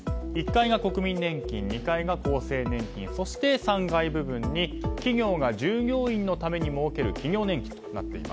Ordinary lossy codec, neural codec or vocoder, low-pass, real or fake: none; none; none; real